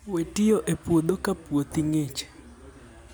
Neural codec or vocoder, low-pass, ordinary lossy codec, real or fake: none; none; none; real